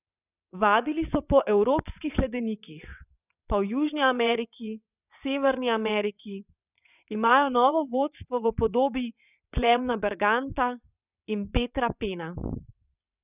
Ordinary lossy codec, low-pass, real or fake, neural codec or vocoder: none; 3.6 kHz; fake; vocoder, 22.05 kHz, 80 mel bands, WaveNeXt